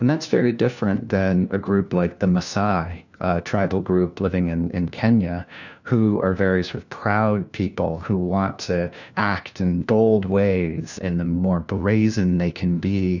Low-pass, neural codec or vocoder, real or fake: 7.2 kHz; codec, 16 kHz, 1 kbps, FunCodec, trained on LibriTTS, 50 frames a second; fake